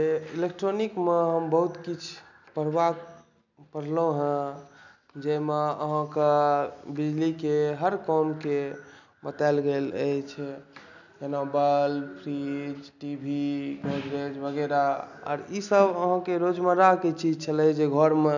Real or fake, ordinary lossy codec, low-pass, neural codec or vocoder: real; none; 7.2 kHz; none